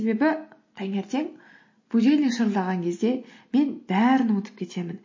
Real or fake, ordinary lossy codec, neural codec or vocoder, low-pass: real; MP3, 32 kbps; none; 7.2 kHz